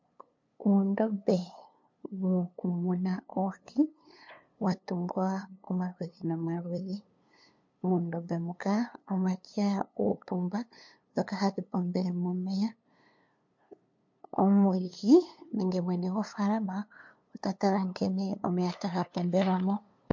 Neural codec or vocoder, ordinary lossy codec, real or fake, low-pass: codec, 16 kHz, 2 kbps, FunCodec, trained on LibriTTS, 25 frames a second; MP3, 48 kbps; fake; 7.2 kHz